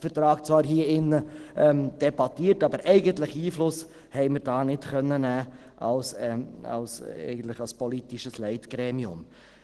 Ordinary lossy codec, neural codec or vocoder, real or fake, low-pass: Opus, 16 kbps; none; real; 10.8 kHz